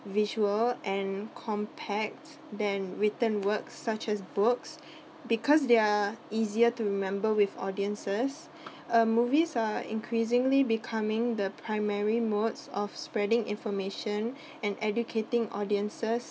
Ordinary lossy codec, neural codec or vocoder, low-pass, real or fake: none; none; none; real